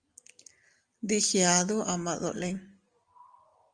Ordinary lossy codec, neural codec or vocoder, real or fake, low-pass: Opus, 32 kbps; none; real; 9.9 kHz